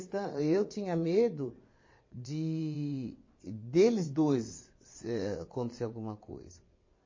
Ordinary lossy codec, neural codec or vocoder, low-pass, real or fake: MP3, 32 kbps; vocoder, 22.05 kHz, 80 mel bands, WaveNeXt; 7.2 kHz; fake